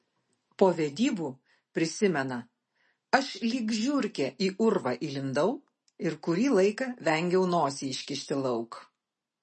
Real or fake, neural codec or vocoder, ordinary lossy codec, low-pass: real; none; MP3, 32 kbps; 10.8 kHz